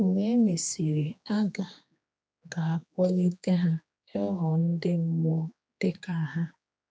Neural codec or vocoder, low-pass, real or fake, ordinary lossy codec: codec, 16 kHz, 2 kbps, X-Codec, HuBERT features, trained on general audio; none; fake; none